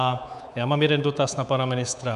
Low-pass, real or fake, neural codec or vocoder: 10.8 kHz; fake; codec, 24 kHz, 3.1 kbps, DualCodec